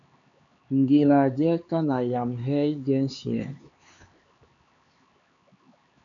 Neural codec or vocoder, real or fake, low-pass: codec, 16 kHz, 4 kbps, X-Codec, HuBERT features, trained on LibriSpeech; fake; 7.2 kHz